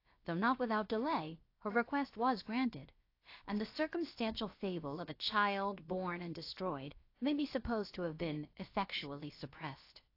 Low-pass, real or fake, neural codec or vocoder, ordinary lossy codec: 5.4 kHz; fake; codec, 16 kHz, about 1 kbps, DyCAST, with the encoder's durations; AAC, 32 kbps